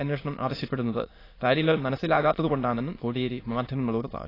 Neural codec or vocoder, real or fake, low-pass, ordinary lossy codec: autoencoder, 22.05 kHz, a latent of 192 numbers a frame, VITS, trained on many speakers; fake; 5.4 kHz; AAC, 24 kbps